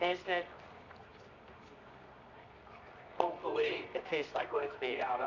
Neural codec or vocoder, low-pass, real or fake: codec, 24 kHz, 0.9 kbps, WavTokenizer, medium music audio release; 7.2 kHz; fake